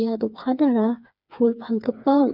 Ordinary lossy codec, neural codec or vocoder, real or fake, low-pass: none; codec, 16 kHz, 4 kbps, FreqCodec, smaller model; fake; 5.4 kHz